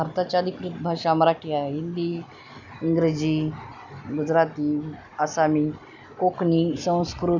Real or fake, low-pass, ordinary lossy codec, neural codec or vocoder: real; 7.2 kHz; none; none